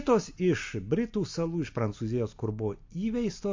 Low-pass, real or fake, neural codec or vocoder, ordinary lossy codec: 7.2 kHz; real; none; MP3, 48 kbps